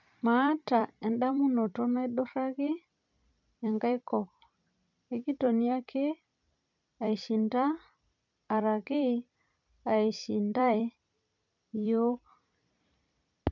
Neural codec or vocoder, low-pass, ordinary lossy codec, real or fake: vocoder, 44.1 kHz, 128 mel bands every 256 samples, BigVGAN v2; 7.2 kHz; none; fake